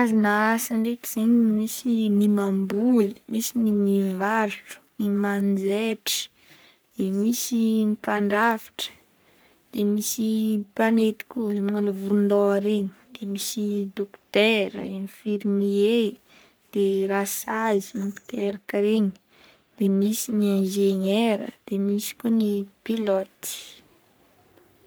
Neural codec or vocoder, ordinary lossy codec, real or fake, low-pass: codec, 44.1 kHz, 3.4 kbps, Pupu-Codec; none; fake; none